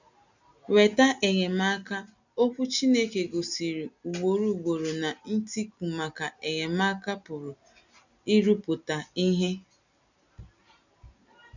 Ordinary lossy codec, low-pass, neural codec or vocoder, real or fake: none; 7.2 kHz; none; real